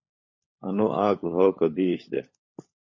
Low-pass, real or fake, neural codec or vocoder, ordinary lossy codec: 7.2 kHz; fake; codec, 16 kHz, 16 kbps, FunCodec, trained on LibriTTS, 50 frames a second; MP3, 32 kbps